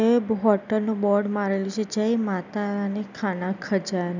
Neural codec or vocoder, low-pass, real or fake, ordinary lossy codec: none; 7.2 kHz; real; none